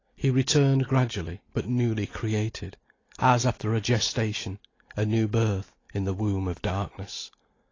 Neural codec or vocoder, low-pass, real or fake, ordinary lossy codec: none; 7.2 kHz; real; AAC, 32 kbps